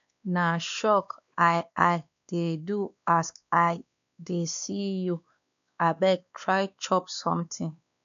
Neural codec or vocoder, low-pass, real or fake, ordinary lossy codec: codec, 16 kHz, 2 kbps, X-Codec, WavLM features, trained on Multilingual LibriSpeech; 7.2 kHz; fake; none